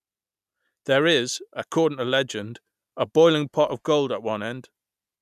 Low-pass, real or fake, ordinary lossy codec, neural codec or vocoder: 14.4 kHz; real; none; none